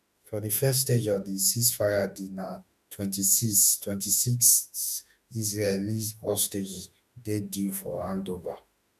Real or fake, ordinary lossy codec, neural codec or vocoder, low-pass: fake; none; autoencoder, 48 kHz, 32 numbers a frame, DAC-VAE, trained on Japanese speech; 14.4 kHz